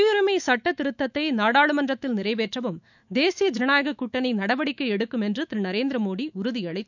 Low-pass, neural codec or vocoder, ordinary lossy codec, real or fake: 7.2 kHz; autoencoder, 48 kHz, 128 numbers a frame, DAC-VAE, trained on Japanese speech; none; fake